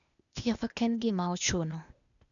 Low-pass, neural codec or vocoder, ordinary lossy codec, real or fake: 7.2 kHz; codec, 16 kHz, 0.8 kbps, ZipCodec; none; fake